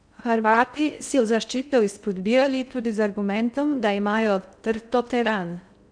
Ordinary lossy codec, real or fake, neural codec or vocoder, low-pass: none; fake; codec, 16 kHz in and 24 kHz out, 0.6 kbps, FocalCodec, streaming, 2048 codes; 9.9 kHz